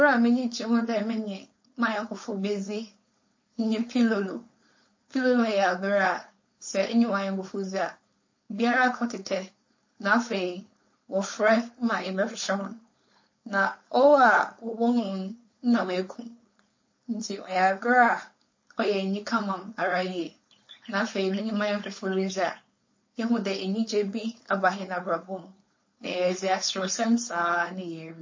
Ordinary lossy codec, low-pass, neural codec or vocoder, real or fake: MP3, 32 kbps; 7.2 kHz; codec, 16 kHz, 4.8 kbps, FACodec; fake